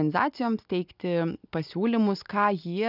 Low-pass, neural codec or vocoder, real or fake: 5.4 kHz; none; real